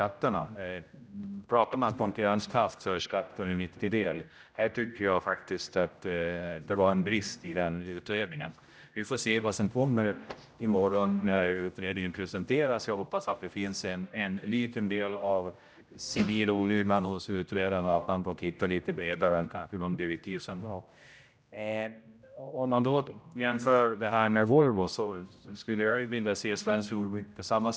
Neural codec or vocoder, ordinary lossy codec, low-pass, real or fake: codec, 16 kHz, 0.5 kbps, X-Codec, HuBERT features, trained on general audio; none; none; fake